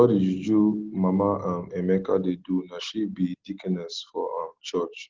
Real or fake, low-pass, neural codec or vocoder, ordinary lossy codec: real; 7.2 kHz; none; Opus, 16 kbps